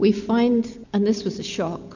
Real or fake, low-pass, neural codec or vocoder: real; 7.2 kHz; none